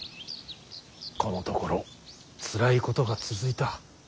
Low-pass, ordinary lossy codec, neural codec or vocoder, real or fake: none; none; none; real